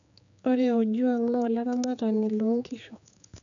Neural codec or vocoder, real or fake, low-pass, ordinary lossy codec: codec, 16 kHz, 4 kbps, X-Codec, HuBERT features, trained on general audio; fake; 7.2 kHz; MP3, 96 kbps